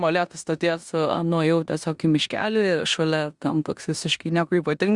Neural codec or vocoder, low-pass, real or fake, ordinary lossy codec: codec, 16 kHz in and 24 kHz out, 0.9 kbps, LongCat-Audio-Codec, four codebook decoder; 10.8 kHz; fake; Opus, 64 kbps